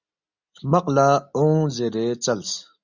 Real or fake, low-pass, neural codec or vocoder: real; 7.2 kHz; none